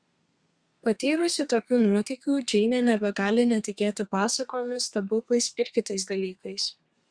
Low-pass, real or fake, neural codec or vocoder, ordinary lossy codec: 9.9 kHz; fake; codec, 32 kHz, 1.9 kbps, SNAC; Opus, 64 kbps